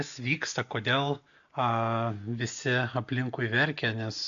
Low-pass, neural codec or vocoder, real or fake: 7.2 kHz; none; real